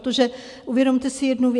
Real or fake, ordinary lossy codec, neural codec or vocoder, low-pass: real; AAC, 64 kbps; none; 10.8 kHz